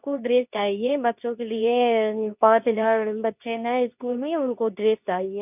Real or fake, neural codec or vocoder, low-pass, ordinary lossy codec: fake; codec, 24 kHz, 0.9 kbps, WavTokenizer, medium speech release version 2; 3.6 kHz; none